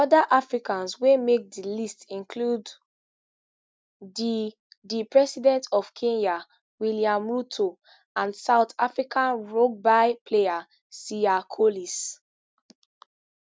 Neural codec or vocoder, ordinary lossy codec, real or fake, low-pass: none; none; real; none